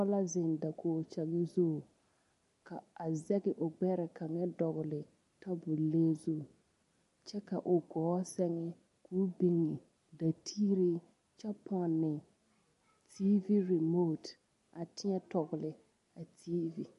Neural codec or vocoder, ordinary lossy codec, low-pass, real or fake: none; MP3, 48 kbps; 14.4 kHz; real